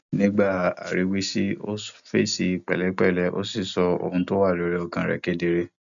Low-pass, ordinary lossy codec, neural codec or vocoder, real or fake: 7.2 kHz; MP3, 96 kbps; none; real